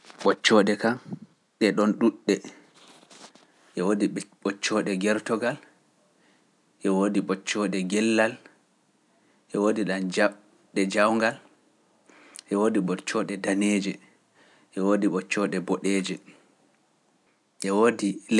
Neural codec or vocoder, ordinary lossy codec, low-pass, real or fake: none; none; none; real